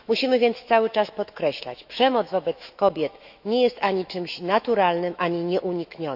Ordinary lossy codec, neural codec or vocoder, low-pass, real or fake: none; autoencoder, 48 kHz, 128 numbers a frame, DAC-VAE, trained on Japanese speech; 5.4 kHz; fake